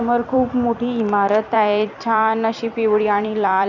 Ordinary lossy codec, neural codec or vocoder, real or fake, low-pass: none; vocoder, 44.1 kHz, 128 mel bands every 256 samples, BigVGAN v2; fake; 7.2 kHz